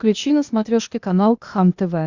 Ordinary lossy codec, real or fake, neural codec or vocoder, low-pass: Opus, 64 kbps; fake; codec, 16 kHz, 0.8 kbps, ZipCodec; 7.2 kHz